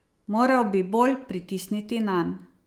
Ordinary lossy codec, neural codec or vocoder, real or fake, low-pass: Opus, 24 kbps; autoencoder, 48 kHz, 128 numbers a frame, DAC-VAE, trained on Japanese speech; fake; 19.8 kHz